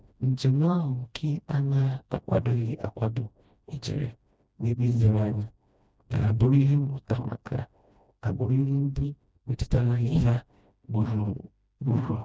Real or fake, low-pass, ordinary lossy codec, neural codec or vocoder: fake; none; none; codec, 16 kHz, 1 kbps, FreqCodec, smaller model